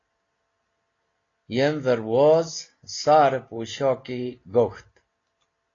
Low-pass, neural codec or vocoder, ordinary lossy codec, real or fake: 7.2 kHz; none; AAC, 32 kbps; real